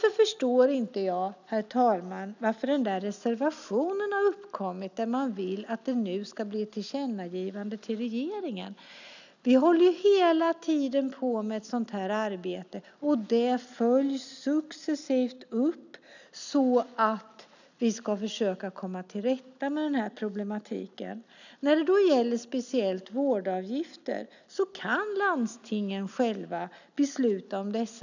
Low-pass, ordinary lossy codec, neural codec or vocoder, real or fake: 7.2 kHz; none; none; real